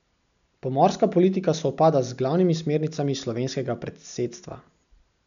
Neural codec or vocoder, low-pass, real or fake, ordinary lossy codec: none; 7.2 kHz; real; none